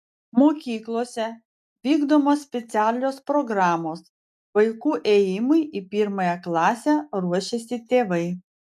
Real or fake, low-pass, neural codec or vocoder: real; 14.4 kHz; none